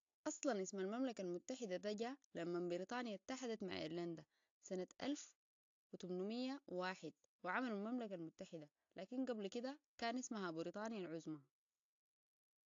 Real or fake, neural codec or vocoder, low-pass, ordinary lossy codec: real; none; 7.2 kHz; AAC, 48 kbps